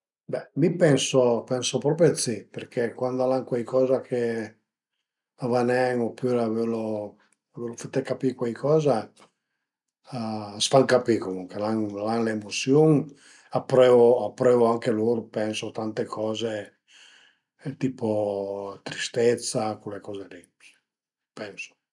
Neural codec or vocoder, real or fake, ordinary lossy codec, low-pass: none; real; none; 10.8 kHz